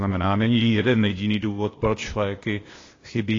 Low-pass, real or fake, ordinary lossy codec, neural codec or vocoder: 7.2 kHz; fake; AAC, 32 kbps; codec, 16 kHz, 0.8 kbps, ZipCodec